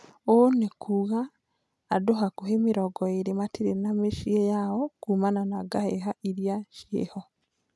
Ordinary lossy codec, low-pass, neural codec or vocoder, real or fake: none; none; none; real